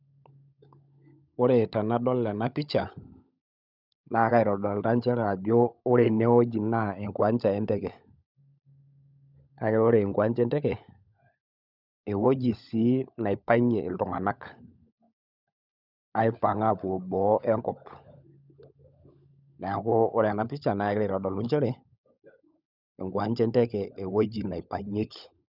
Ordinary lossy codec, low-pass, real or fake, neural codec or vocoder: none; 5.4 kHz; fake; codec, 16 kHz, 16 kbps, FunCodec, trained on LibriTTS, 50 frames a second